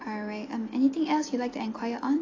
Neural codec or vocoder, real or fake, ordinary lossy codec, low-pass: none; real; AAC, 32 kbps; 7.2 kHz